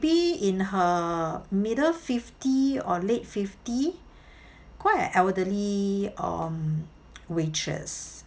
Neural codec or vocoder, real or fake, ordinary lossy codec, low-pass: none; real; none; none